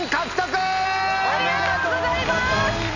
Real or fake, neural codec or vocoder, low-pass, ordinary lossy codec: real; none; 7.2 kHz; none